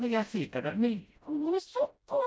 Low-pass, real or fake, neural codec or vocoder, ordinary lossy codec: none; fake; codec, 16 kHz, 0.5 kbps, FreqCodec, smaller model; none